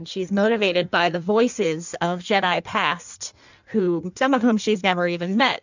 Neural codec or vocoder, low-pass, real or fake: codec, 16 kHz in and 24 kHz out, 1.1 kbps, FireRedTTS-2 codec; 7.2 kHz; fake